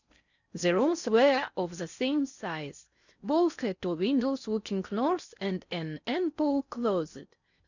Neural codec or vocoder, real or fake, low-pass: codec, 16 kHz in and 24 kHz out, 0.6 kbps, FocalCodec, streaming, 4096 codes; fake; 7.2 kHz